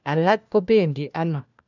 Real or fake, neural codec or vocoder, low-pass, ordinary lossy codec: fake; codec, 16 kHz, 1 kbps, FunCodec, trained on LibriTTS, 50 frames a second; 7.2 kHz; none